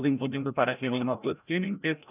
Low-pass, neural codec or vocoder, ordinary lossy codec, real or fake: 3.6 kHz; codec, 16 kHz, 0.5 kbps, FreqCodec, larger model; none; fake